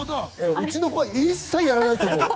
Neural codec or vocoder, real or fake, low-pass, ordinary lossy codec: codec, 16 kHz, 4 kbps, X-Codec, HuBERT features, trained on general audio; fake; none; none